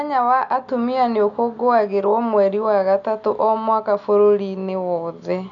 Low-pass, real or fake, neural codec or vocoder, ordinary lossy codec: 7.2 kHz; real; none; none